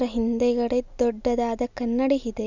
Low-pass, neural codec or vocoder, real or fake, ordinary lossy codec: 7.2 kHz; none; real; none